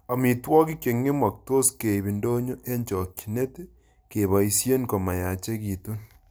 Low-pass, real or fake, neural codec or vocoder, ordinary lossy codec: none; real; none; none